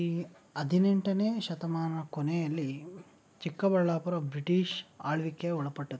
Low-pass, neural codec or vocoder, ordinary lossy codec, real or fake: none; none; none; real